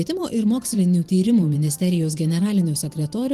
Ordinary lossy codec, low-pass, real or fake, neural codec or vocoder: Opus, 24 kbps; 14.4 kHz; fake; vocoder, 44.1 kHz, 128 mel bands every 512 samples, BigVGAN v2